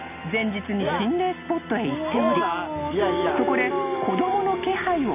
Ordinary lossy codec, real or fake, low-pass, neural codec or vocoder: none; real; 3.6 kHz; none